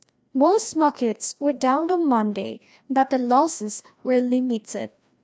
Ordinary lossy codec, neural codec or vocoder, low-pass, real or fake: none; codec, 16 kHz, 1 kbps, FreqCodec, larger model; none; fake